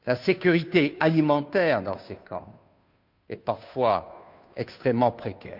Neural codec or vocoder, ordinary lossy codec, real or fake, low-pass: codec, 16 kHz, 2 kbps, FunCodec, trained on Chinese and English, 25 frames a second; none; fake; 5.4 kHz